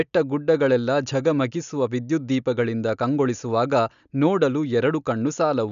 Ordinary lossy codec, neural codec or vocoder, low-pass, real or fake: none; none; 7.2 kHz; real